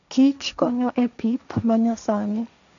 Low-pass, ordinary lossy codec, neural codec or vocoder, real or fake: 7.2 kHz; none; codec, 16 kHz, 1.1 kbps, Voila-Tokenizer; fake